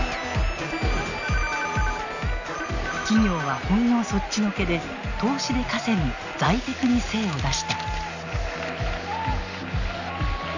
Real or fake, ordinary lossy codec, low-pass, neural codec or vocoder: real; none; 7.2 kHz; none